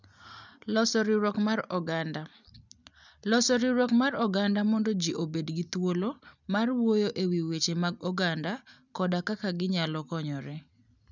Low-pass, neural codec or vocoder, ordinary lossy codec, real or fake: 7.2 kHz; none; none; real